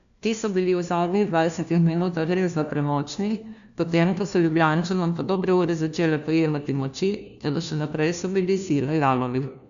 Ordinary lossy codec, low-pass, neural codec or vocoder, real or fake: AAC, 96 kbps; 7.2 kHz; codec, 16 kHz, 1 kbps, FunCodec, trained on LibriTTS, 50 frames a second; fake